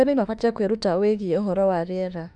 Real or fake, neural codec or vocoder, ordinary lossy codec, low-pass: fake; autoencoder, 48 kHz, 32 numbers a frame, DAC-VAE, trained on Japanese speech; none; 10.8 kHz